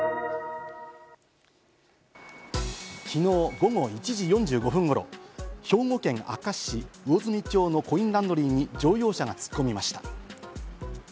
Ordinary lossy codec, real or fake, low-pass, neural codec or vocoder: none; real; none; none